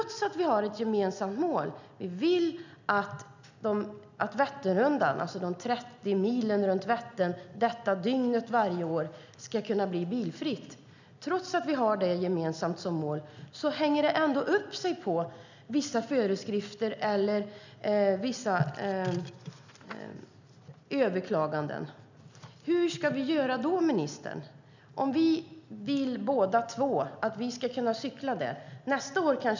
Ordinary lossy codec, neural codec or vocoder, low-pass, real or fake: none; none; 7.2 kHz; real